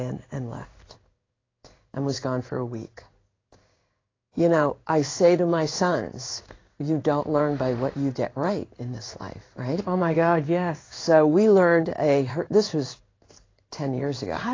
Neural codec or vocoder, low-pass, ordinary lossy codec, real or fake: codec, 16 kHz in and 24 kHz out, 1 kbps, XY-Tokenizer; 7.2 kHz; AAC, 32 kbps; fake